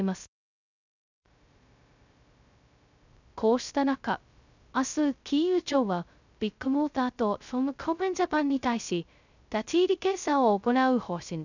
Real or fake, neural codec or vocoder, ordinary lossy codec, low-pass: fake; codec, 16 kHz, 0.2 kbps, FocalCodec; none; 7.2 kHz